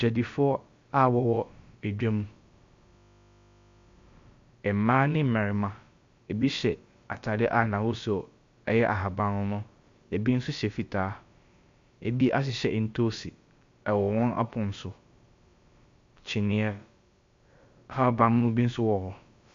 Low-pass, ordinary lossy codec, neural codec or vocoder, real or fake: 7.2 kHz; MP3, 48 kbps; codec, 16 kHz, about 1 kbps, DyCAST, with the encoder's durations; fake